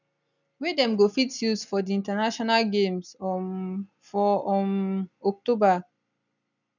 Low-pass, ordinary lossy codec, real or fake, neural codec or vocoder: 7.2 kHz; none; real; none